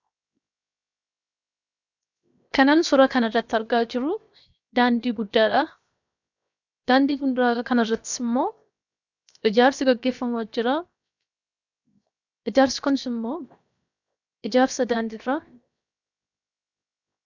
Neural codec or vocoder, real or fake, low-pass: codec, 16 kHz, 0.7 kbps, FocalCodec; fake; 7.2 kHz